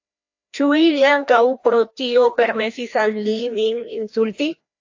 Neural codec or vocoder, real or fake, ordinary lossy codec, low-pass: codec, 16 kHz, 1 kbps, FreqCodec, larger model; fake; AAC, 48 kbps; 7.2 kHz